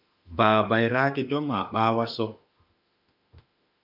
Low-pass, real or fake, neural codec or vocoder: 5.4 kHz; fake; autoencoder, 48 kHz, 32 numbers a frame, DAC-VAE, trained on Japanese speech